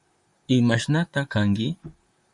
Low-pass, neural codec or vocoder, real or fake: 10.8 kHz; vocoder, 44.1 kHz, 128 mel bands, Pupu-Vocoder; fake